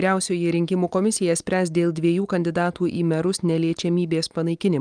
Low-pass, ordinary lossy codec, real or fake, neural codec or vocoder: 9.9 kHz; Opus, 32 kbps; real; none